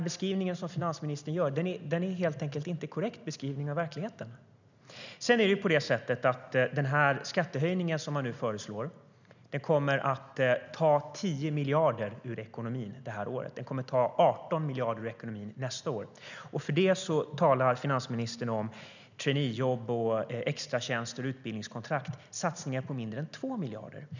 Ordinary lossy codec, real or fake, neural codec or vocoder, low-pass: none; real; none; 7.2 kHz